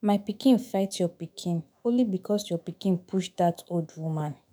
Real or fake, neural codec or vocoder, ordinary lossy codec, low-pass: fake; autoencoder, 48 kHz, 128 numbers a frame, DAC-VAE, trained on Japanese speech; none; none